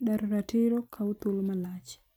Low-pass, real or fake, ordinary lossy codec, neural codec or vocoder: none; real; none; none